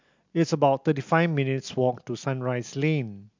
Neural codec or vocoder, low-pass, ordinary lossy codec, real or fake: none; 7.2 kHz; MP3, 64 kbps; real